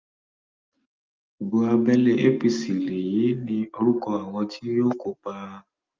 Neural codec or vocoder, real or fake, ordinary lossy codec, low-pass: none; real; Opus, 32 kbps; 7.2 kHz